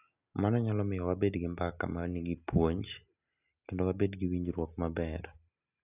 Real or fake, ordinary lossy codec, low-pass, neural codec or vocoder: real; none; 3.6 kHz; none